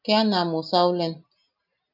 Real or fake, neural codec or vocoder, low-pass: real; none; 5.4 kHz